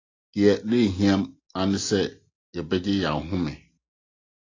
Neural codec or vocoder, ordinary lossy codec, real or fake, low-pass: none; AAC, 32 kbps; real; 7.2 kHz